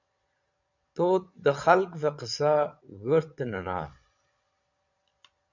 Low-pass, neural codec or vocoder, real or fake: 7.2 kHz; vocoder, 22.05 kHz, 80 mel bands, Vocos; fake